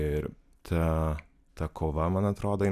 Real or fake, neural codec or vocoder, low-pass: real; none; 14.4 kHz